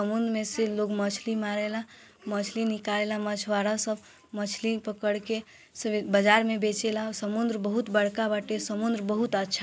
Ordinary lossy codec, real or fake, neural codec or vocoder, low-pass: none; real; none; none